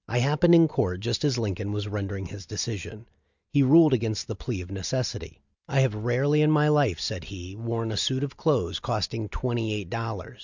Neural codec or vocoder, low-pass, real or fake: none; 7.2 kHz; real